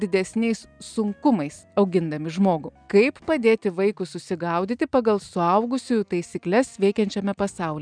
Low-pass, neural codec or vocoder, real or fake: 9.9 kHz; none; real